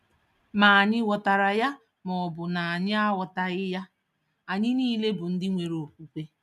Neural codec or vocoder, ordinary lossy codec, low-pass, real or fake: none; none; 14.4 kHz; real